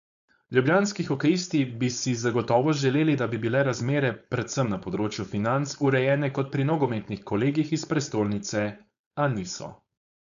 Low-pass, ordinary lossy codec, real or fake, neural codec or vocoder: 7.2 kHz; AAC, 96 kbps; fake; codec, 16 kHz, 4.8 kbps, FACodec